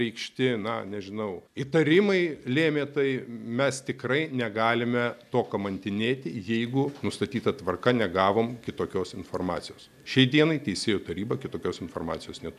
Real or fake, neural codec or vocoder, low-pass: real; none; 14.4 kHz